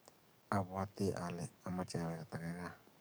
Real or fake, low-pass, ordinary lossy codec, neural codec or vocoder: fake; none; none; codec, 44.1 kHz, 7.8 kbps, DAC